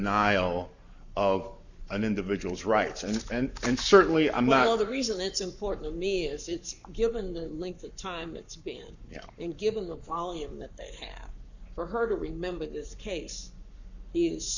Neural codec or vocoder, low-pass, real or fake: codec, 44.1 kHz, 7.8 kbps, Pupu-Codec; 7.2 kHz; fake